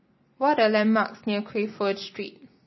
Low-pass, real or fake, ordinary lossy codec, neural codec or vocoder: 7.2 kHz; real; MP3, 24 kbps; none